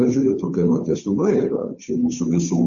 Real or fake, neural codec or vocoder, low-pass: fake; codec, 16 kHz, 2 kbps, FunCodec, trained on Chinese and English, 25 frames a second; 7.2 kHz